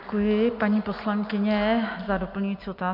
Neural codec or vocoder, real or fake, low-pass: none; real; 5.4 kHz